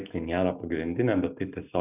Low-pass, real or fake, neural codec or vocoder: 3.6 kHz; real; none